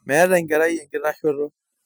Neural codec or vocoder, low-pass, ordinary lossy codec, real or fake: vocoder, 44.1 kHz, 128 mel bands every 256 samples, BigVGAN v2; none; none; fake